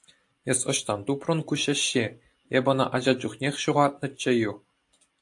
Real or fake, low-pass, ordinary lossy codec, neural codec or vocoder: real; 10.8 kHz; AAC, 64 kbps; none